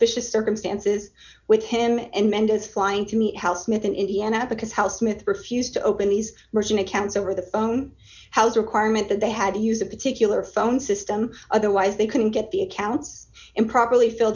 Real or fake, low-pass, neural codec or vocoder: real; 7.2 kHz; none